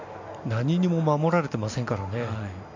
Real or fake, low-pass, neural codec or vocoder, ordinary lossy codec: real; 7.2 kHz; none; MP3, 64 kbps